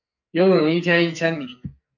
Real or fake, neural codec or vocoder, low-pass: fake; codec, 44.1 kHz, 2.6 kbps, SNAC; 7.2 kHz